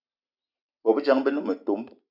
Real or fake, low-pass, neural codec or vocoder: real; 5.4 kHz; none